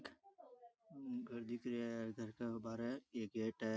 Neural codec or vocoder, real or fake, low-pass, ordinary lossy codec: none; real; none; none